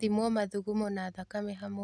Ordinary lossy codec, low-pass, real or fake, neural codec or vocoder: none; none; real; none